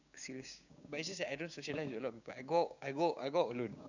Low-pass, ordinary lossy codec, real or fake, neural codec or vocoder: 7.2 kHz; none; fake; codec, 16 kHz, 6 kbps, DAC